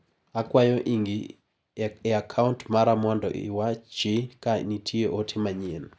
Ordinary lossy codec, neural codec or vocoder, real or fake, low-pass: none; none; real; none